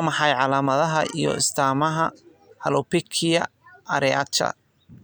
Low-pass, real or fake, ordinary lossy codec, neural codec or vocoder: none; real; none; none